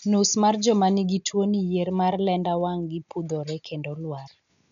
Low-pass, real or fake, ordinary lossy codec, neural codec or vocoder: 7.2 kHz; real; none; none